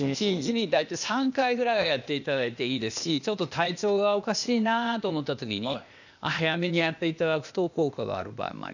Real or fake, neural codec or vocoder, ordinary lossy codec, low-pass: fake; codec, 16 kHz, 0.8 kbps, ZipCodec; none; 7.2 kHz